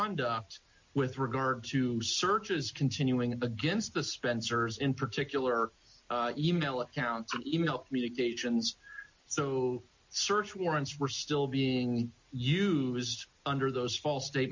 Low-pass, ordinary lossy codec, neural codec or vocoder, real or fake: 7.2 kHz; MP3, 48 kbps; none; real